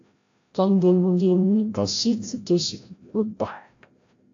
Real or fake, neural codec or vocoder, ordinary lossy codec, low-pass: fake; codec, 16 kHz, 0.5 kbps, FreqCodec, larger model; AAC, 48 kbps; 7.2 kHz